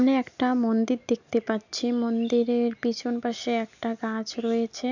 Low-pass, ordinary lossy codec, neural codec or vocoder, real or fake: 7.2 kHz; none; none; real